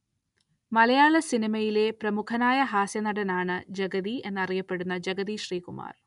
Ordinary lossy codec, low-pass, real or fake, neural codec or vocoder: none; 10.8 kHz; real; none